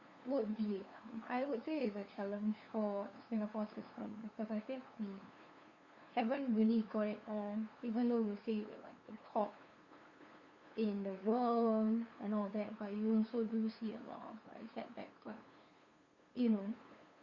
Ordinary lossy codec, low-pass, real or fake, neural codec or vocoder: Opus, 64 kbps; 7.2 kHz; fake; codec, 16 kHz, 2 kbps, FunCodec, trained on LibriTTS, 25 frames a second